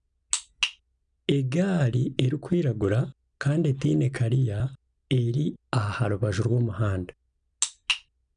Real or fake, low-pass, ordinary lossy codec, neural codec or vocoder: real; 9.9 kHz; none; none